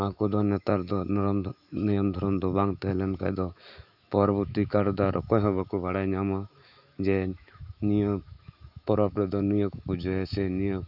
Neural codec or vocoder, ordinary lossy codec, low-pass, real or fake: autoencoder, 48 kHz, 128 numbers a frame, DAC-VAE, trained on Japanese speech; none; 5.4 kHz; fake